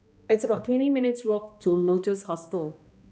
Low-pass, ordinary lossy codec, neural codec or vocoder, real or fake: none; none; codec, 16 kHz, 1 kbps, X-Codec, HuBERT features, trained on balanced general audio; fake